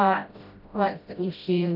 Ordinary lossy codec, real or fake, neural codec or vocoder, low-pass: none; fake; codec, 16 kHz, 0.5 kbps, FreqCodec, smaller model; 5.4 kHz